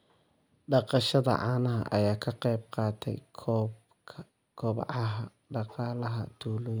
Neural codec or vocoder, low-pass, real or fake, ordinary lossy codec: none; none; real; none